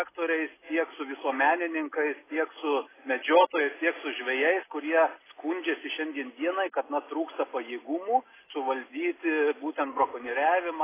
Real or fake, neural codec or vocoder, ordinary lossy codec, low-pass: real; none; AAC, 16 kbps; 3.6 kHz